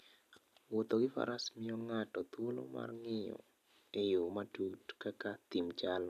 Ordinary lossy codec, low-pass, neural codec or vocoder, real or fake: none; 14.4 kHz; none; real